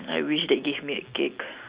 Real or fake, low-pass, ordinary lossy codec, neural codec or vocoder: real; 3.6 kHz; Opus, 64 kbps; none